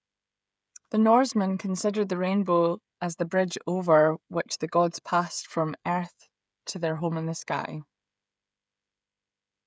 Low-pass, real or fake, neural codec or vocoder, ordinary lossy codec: none; fake; codec, 16 kHz, 16 kbps, FreqCodec, smaller model; none